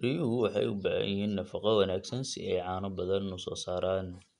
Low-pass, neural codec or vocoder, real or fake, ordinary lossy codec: 10.8 kHz; none; real; none